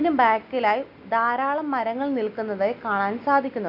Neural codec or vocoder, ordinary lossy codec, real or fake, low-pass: none; none; real; 5.4 kHz